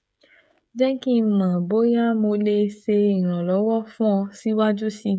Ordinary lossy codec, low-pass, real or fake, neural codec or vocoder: none; none; fake; codec, 16 kHz, 16 kbps, FreqCodec, smaller model